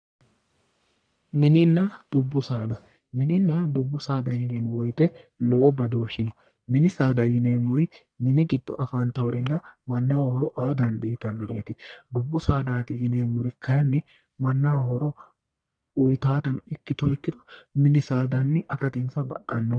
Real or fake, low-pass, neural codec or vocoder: fake; 9.9 kHz; codec, 44.1 kHz, 1.7 kbps, Pupu-Codec